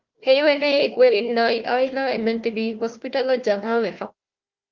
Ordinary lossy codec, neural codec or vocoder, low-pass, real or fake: Opus, 32 kbps; codec, 16 kHz, 1 kbps, FunCodec, trained on Chinese and English, 50 frames a second; 7.2 kHz; fake